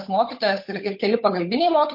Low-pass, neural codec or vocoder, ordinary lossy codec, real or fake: 5.4 kHz; codec, 16 kHz, 8 kbps, FunCodec, trained on Chinese and English, 25 frames a second; MP3, 32 kbps; fake